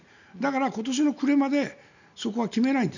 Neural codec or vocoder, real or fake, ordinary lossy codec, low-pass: none; real; none; 7.2 kHz